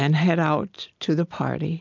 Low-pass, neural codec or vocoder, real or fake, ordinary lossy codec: 7.2 kHz; none; real; MP3, 64 kbps